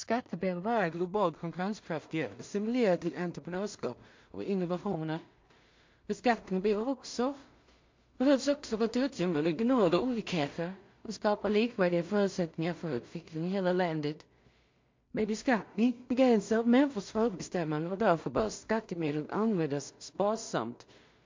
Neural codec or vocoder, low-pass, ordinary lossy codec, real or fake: codec, 16 kHz in and 24 kHz out, 0.4 kbps, LongCat-Audio-Codec, two codebook decoder; 7.2 kHz; MP3, 48 kbps; fake